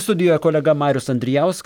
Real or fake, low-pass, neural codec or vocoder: real; 19.8 kHz; none